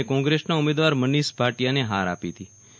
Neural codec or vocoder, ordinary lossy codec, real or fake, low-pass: none; none; real; none